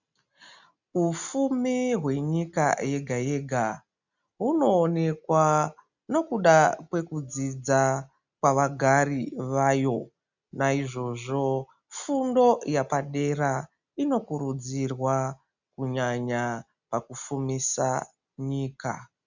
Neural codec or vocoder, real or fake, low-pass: none; real; 7.2 kHz